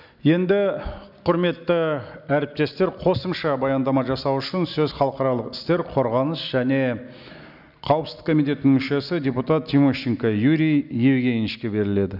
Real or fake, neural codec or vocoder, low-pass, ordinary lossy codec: real; none; 5.4 kHz; none